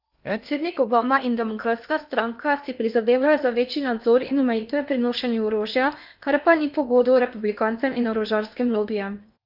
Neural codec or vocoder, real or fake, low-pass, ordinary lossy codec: codec, 16 kHz in and 24 kHz out, 0.8 kbps, FocalCodec, streaming, 65536 codes; fake; 5.4 kHz; none